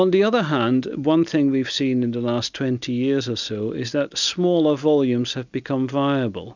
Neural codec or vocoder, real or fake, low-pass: none; real; 7.2 kHz